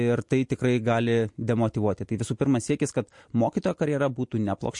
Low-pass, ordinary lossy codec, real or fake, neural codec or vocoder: 10.8 kHz; MP3, 48 kbps; real; none